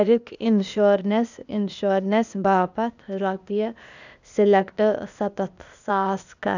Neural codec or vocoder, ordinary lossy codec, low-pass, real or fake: codec, 16 kHz, 0.8 kbps, ZipCodec; none; 7.2 kHz; fake